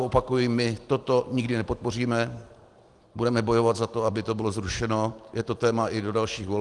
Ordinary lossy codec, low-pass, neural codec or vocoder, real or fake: Opus, 24 kbps; 10.8 kHz; none; real